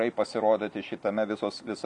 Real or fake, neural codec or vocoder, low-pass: real; none; 10.8 kHz